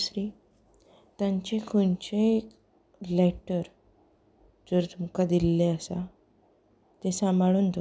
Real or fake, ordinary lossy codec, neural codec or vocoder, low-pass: real; none; none; none